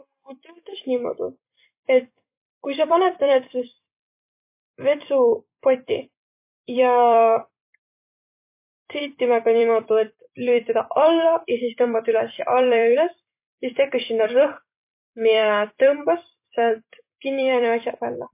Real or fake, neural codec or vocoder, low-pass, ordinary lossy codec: fake; vocoder, 44.1 kHz, 128 mel bands every 256 samples, BigVGAN v2; 3.6 kHz; MP3, 24 kbps